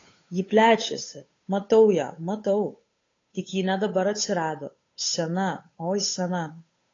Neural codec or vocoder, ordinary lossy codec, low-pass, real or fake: codec, 16 kHz, 8 kbps, FunCodec, trained on Chinese and English, 25 frames a second; AAC, 32 kbps; 7.2 kHz; fake